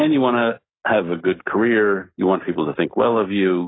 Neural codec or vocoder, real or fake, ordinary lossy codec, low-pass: none; real; AAC, 16 kbps; 7.2 kHz